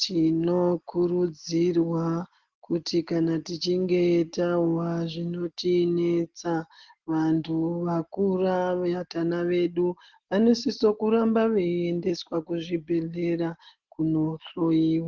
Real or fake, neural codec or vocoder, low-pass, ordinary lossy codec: real; none; 7.2 kHz; Opus, 16 kbps